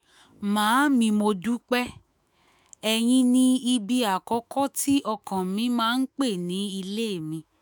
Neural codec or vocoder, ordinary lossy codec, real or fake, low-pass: autoencoder, 48 kHz, 128 numbers a frame, DAC-VAE, trained on Japanese speech; none; fake; none